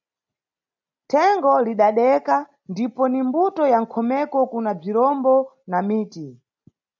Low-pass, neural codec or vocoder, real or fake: 7.2 kHz; none; real